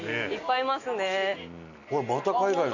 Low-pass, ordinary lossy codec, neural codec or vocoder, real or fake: 7.2 kHz; none; none; real